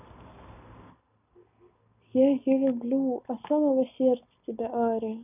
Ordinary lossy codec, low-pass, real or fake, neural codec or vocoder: none; 3.6 kHz; real; none